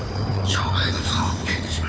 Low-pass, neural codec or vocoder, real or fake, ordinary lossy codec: none; codec, 16 kHz, 4 kbps, FunCodec, trained on Chinese and English, 50 frames a second; fake; none